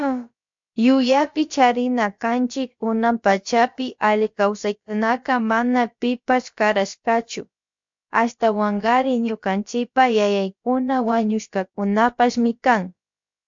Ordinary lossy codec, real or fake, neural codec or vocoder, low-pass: MP3, 48 kbps; fake; codec, 16 kHz, about 1 kbps, DyCAST, with the encoder's durations; 7.2 kHz